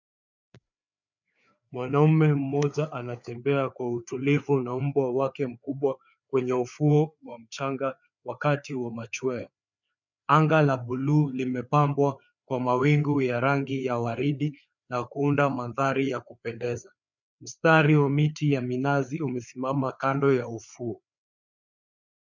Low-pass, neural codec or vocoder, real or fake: 7.2 kHz; codec, 16 kHz, 4 kbps, FreqCodec, larger model; fake